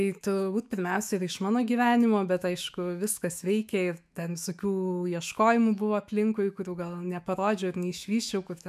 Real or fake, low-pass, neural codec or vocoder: fake; 14.4 kHz; autoencoder, 48 kHz, 128 numbers a frame, DAC-VAE, trained on Japanese speech